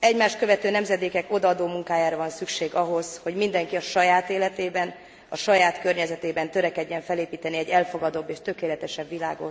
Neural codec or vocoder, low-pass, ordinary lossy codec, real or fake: none; none; none; real